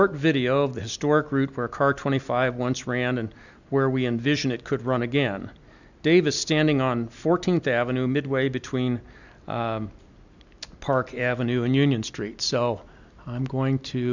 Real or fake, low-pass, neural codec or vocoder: real; 7.2 kHz; none